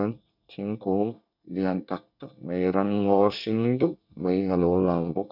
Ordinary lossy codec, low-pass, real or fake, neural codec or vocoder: none; 5.4 kHz; fake; codec, 24 kHz, 1 kbps, SNAC